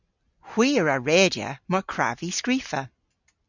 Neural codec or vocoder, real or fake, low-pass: none; real; 7.2 kHz